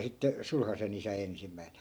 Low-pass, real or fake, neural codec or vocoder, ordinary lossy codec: none; real; none; none